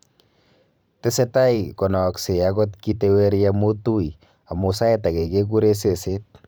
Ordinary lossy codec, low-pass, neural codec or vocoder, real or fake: none; none; vocoder, 44.1 kHz, 128 mel bands every 512 samples, BigVGAN v2; fake